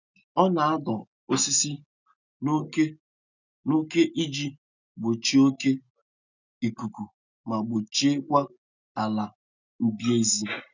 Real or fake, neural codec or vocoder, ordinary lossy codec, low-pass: real; none; none; 7.2 kHz